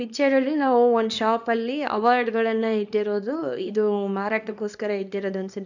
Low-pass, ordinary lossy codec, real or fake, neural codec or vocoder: 7.2 kHz; none; fake; codec, 24 kHz, 0.9 kbps, WavTokenizer, small release